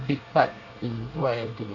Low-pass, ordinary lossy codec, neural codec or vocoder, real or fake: 7.2 kHz; none; codec, 24 kHz, 1 kbps, SNAC; fake